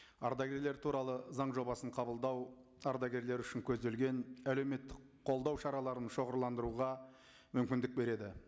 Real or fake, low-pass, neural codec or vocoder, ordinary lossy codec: real; none; none; none